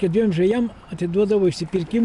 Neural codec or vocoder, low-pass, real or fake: none; 10.8 kHz; real